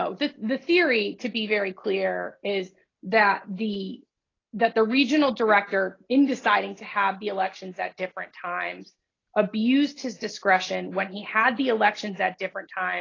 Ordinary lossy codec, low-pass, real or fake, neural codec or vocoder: AAC, 32 kbps; 7.2 kHz; real; none